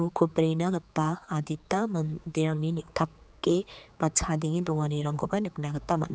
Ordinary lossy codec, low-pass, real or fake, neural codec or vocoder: none; none; fake; codec, 16 kHz, 4 kbps, X-Codec, HuBERT features, trained on general audio